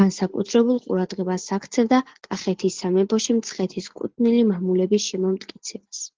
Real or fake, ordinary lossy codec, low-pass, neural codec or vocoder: real; Opus, 16 kbps; 7.2 kHz; none